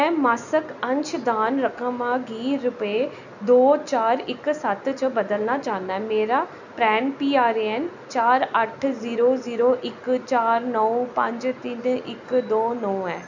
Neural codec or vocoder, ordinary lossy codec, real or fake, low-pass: none; none; real; 7.2 kHz